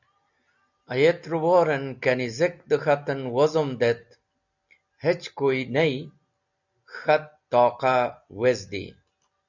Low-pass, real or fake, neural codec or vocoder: 7.2 kHz; real; none